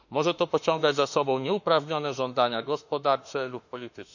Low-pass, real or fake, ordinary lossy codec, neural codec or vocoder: 7.2 kHz; fake; none; autoencoder, 48 kHz, 32 numbers a frame, DAC-VAE, trained on Japanese speech